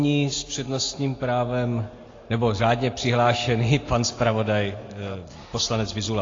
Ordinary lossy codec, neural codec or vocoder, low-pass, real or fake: AAC, 32 kbps; none; 7.2 kHz; real